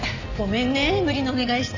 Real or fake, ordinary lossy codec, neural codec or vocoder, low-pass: real; none; none; 7.2 kHz